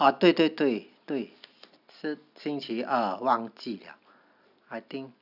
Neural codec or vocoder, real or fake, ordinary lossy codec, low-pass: none; real; none; 5.4 kHz